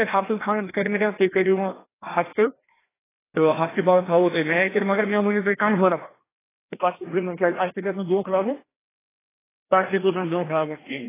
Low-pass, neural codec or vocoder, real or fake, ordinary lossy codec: 3.6 kHz; codec, 16 kHz, 1 kbps, FreqCodec, larger model; fake; AAC, 16 kbps